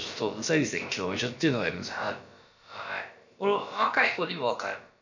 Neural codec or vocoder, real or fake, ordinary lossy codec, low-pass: codec, 16 kHz, about 1 kbps, DyCAST, with the encoder's durations; fake; none; 7.2 kHz